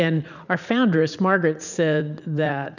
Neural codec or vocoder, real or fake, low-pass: vocoder, 44.1 kHz, 80 mel bands, Vocos; fake; 7.2 kHz